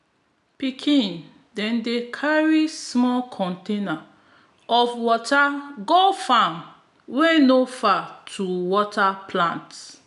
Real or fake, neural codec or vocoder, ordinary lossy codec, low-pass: real; none; none; 10.8 kHz